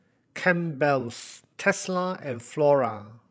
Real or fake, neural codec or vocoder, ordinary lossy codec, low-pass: fake; codec, 16 kHz, 16 kbps, FreqCodec, larger model; none; none